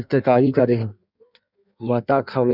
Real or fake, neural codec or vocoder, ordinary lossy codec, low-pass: fake; codec, 16 kHz in and 24 kHz out, 1.1 kbps, FireRedTTS-2 codec; none; 5.4 kHz